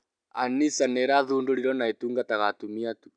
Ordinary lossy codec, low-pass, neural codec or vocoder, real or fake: none; 9.9 kHz; none; real